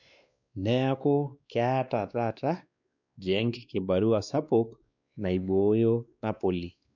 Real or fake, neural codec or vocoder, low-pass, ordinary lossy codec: fake; codec, 16 kHz, 2 kbps, X-Codec, WavLM features, trained on Multilingual LibriSpeech; 7.2 kHz; none